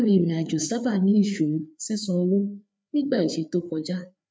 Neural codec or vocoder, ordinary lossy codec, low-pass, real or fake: codec, 16 kHz, 4 kbps, FreqCodec, larger model; none; none; fake